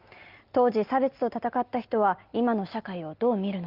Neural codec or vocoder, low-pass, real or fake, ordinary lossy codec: none; 5.4 kHz; real; Opus, 32 kbps